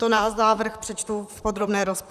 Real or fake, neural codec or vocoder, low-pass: fake; vocoder, 44.1 kHz, 128 mel bands, Pupu-Vocoder; 14.4 kHz